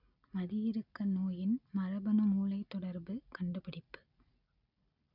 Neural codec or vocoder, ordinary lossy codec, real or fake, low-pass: none; none; real; 5.4 kHz